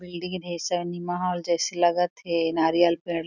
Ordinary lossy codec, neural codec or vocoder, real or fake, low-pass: none; none; real; 7.2 kHz